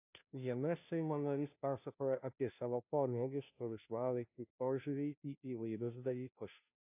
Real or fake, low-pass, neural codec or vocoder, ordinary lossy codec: fake; 3.6 kHz; codec, 16 kHz, 0.5 kbps, FunCodec, trained on Chinese and English, 25 frames a second; AAC, 32 kbps